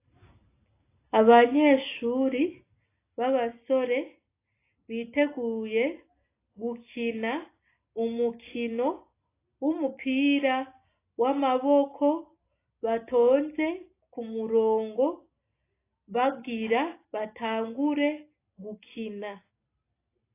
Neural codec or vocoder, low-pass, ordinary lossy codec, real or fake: none; 3.6 kHz; AAC, 24 kbps; real